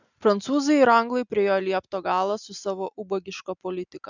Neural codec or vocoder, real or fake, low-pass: none; real; 7.2 kHz